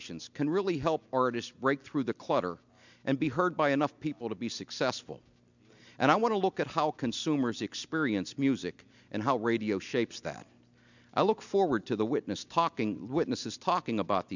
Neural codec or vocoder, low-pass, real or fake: none; 7.2 kHz; real